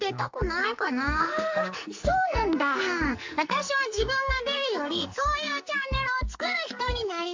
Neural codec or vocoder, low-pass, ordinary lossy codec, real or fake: codec, 44.1 kHz, 2.6 kbps, SNAC; 7.2 kHz; MP3, 48 kbps; fake